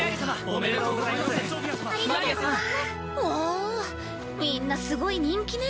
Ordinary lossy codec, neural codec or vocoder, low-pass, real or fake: none; none; none; real